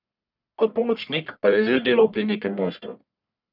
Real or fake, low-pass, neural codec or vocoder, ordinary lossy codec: fake; 5.4 kHz; codec, 44.1 kHz, 1.7 kbps, Pupu-Codec; none